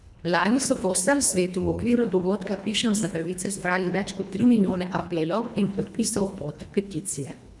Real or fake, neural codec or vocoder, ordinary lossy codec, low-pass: fake; codec, 24 kHz, 1.5 kbps, HILCodec; none; none